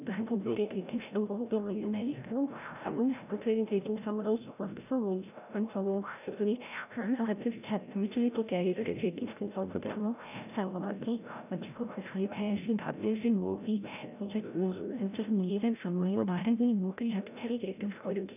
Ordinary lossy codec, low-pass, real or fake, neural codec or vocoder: none; 3.6 kHz; fake; codec, 16 kHz, 0.5 kbps, FreqCodec, larger model